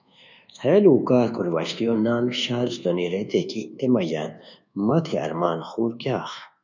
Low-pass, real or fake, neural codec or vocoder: 7.2 kHz; fake; codec, 24 kHz, 1.2 kbps, DualCodec